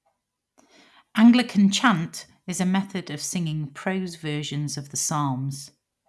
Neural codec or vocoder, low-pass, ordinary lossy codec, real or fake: none; none; none; real